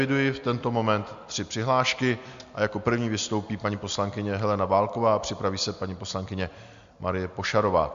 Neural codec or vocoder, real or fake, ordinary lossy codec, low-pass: none; real; MP3, 64 kbps; 7.2 kHz